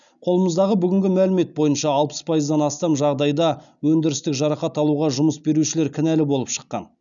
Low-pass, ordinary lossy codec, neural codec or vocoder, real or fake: 7.2 kHz; none; none; real